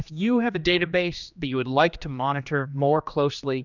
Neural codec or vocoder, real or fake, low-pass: codec, 16 kHz, 2 kbps, X-Codec, HuBERT features, trained on general audio; fake; 7.2 kHz